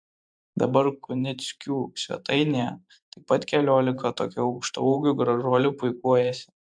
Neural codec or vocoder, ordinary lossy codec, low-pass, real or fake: vocoder, 44.1 kHz, 128 mel bands every 512 samples, BigVGAN v2; AAC, 64 kbps; 9.9 kHz; fake